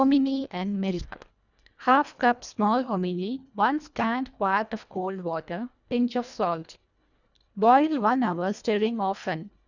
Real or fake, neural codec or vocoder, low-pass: fake; codec, 24 kHz, 1.5 kbps, HILCodec; 7.2 kHz